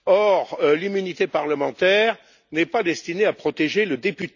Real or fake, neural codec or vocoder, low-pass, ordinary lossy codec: real; none; 7.2 kHz; none